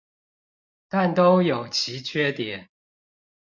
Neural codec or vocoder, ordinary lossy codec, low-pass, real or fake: none; MP3, 64 kbps; 7.2 kHz; real